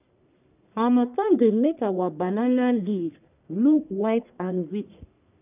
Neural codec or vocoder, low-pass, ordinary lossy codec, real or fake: codec, 44.1 kHz, 1.7 kbps, Pupu-Codec; 3.6 kHz; none; fake